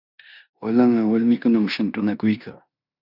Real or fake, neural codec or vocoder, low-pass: fake; codec, 16 kHz in and 24 kHz out, 0.9 kbps, LongCat-Audio-Codec, four codebook decoder; 5.4 kHz